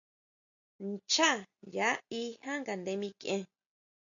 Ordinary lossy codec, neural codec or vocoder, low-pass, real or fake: MP3, 96 kbps; none; 7.2 kHz; real